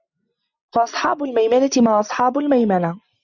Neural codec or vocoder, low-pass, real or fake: none; 7.2 kHz; real